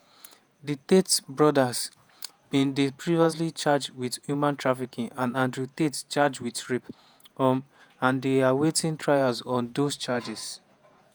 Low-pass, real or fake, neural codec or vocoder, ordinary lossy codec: none; fake; vocoder, 48 kHz, 128 mel bands, Vocos; none